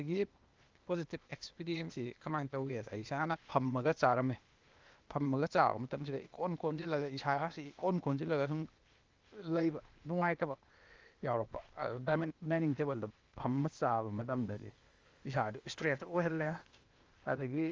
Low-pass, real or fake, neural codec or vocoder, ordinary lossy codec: 7.2 kHz; fake; codec, 16 kHz, 0.8 kbps, ZipCodec; Opus, 32 kbps